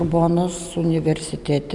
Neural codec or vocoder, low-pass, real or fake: none; 10.8 kHz; real